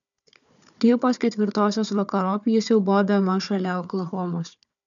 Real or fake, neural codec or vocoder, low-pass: fake; codec, 16 kHz, 4 kbps, FunCodec, trained on Chinese and English, 50 frames a second; 7.2 kHz